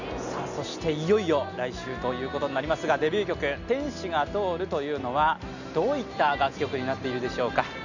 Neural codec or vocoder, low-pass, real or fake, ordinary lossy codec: none; 7.2 kHz; real; none